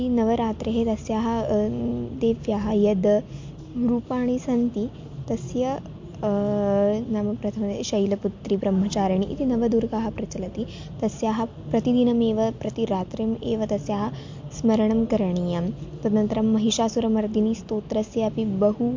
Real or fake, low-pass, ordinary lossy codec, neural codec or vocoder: real; 7.2 kHz; MP3, 48 kbps; none